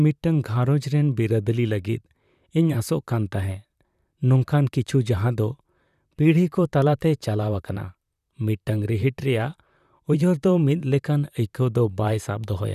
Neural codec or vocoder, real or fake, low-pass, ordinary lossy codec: vocoder, 44.1 kHz, 128 mel bands, Pupu-Vocoder; fake; 14.4 kHz; none